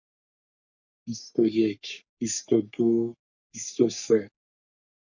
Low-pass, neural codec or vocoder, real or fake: 7.2 kHz; codec, 44.1 kHz, 3.4 kbps, Pupu-Codec; fake